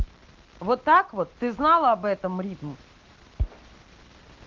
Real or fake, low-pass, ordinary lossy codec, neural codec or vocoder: real; 7.2 kHz; Opus, 16 kbps; none